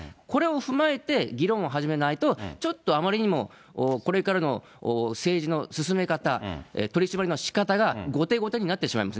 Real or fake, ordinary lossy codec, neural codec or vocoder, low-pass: real; none; none; none